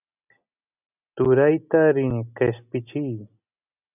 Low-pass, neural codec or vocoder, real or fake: 3.6 kHz; none; real